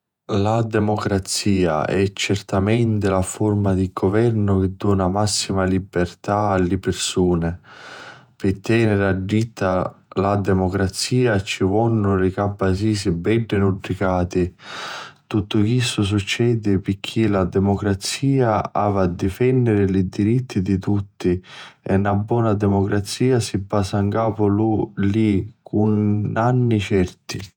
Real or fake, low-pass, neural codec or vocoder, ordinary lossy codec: fake; 19.8 kHz; vocoder, 48 kHz, 128 mel bands, Vocos; none